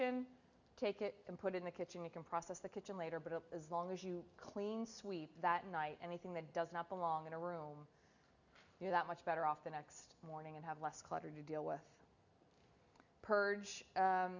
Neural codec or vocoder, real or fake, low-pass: none; real; 7.2 kHz